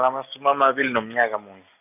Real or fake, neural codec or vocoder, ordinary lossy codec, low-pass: real; none; none; 3.6 kHz